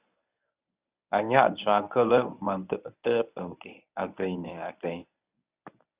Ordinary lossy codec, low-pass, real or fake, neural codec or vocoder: Opus, 64 kbps; 3.6 kHz; fake; codec, 24 kHz, 0.9 kbps, WavTokenizer, medium speech release version 1